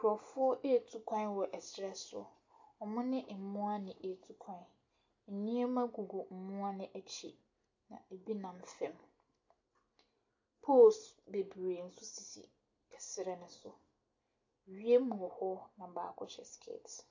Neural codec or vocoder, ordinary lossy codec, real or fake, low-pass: none; AAC, 48 kbps; real; 7.2 kHz